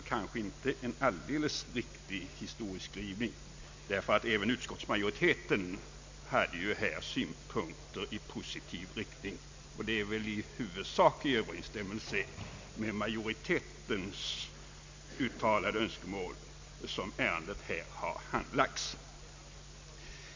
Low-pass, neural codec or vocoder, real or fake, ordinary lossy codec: 7.2 kHz; none; real; MP3, 64 kbps